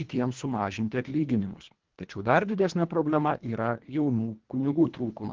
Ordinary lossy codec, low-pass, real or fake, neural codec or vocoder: Opus, 16 kbps; 7.2 kHz; fake; codec, 24 kHz, 1.5 kbps, HILCodec